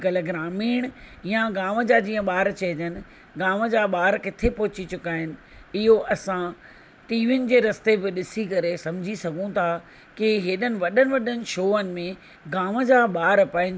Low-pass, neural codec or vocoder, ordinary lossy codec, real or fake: none; none; none; real